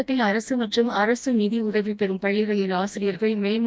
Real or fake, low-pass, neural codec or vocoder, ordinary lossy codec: fake; none; codec, 16 kHz, 1 kbps, FreqCodec, smaller model; none